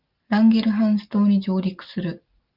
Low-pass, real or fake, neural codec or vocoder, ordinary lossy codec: 5.4 kHz; real; none; Opus, 24 kbps